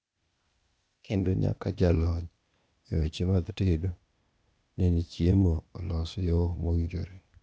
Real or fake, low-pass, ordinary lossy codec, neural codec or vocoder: fake; none; none; codec, 16 kHz, 0.8 kbps, ZipCodec